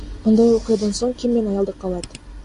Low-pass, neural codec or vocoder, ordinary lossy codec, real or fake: 10.8 kHz; none; AAC, 48 kbps; real